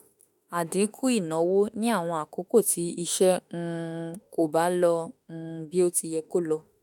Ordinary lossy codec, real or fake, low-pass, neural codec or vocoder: none; fake; 19.8 kHz; autoencoder, 48 kHz, 32 numbers a frame, DAC-VAE, trained on Japanese speech